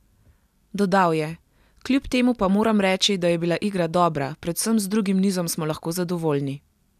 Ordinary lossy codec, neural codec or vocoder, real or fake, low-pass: none; none; real; 14.4 kHz